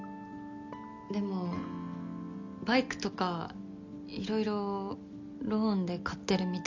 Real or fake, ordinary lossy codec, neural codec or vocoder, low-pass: real; none; none; 7.2 kHz